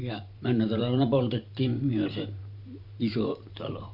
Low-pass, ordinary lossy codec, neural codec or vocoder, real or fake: 5.4 kHz; none; vocoder, 44.1 kHz, 128 mel bands every 512 samples, BigVGAN v2; fake